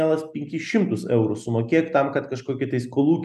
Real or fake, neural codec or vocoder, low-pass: real; none; 14.4 kHz